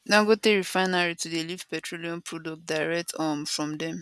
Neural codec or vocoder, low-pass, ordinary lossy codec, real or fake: none; none; none; real